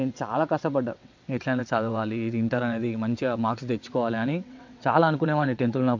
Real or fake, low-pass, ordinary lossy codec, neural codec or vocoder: fake; 7.2 kHz; MP3, 48 kbps; vocoder, 22.05 kHz, 80 mel bands, WaveNeXt